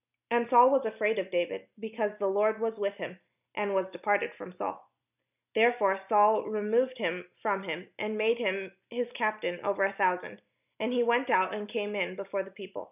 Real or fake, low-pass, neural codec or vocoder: real; 3.6 kHz; none